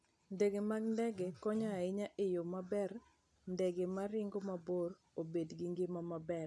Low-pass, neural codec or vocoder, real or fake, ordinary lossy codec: none; none; real; none